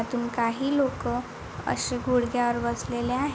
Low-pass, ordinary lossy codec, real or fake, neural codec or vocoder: none; none; real; none